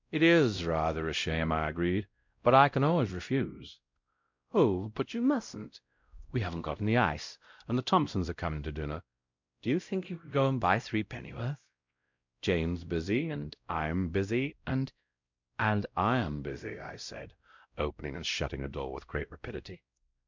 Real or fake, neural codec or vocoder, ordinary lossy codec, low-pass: fake; codec, 16 kHz, 0.5 kbps, X-Codec, WavLM features, trained on Multilingual LibriSpeech; MP3, 64 kbps; 7.2 kHz